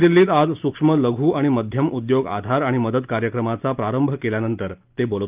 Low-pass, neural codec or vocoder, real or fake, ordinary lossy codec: 3.6 kHz; none; real; Opus, 32 kbps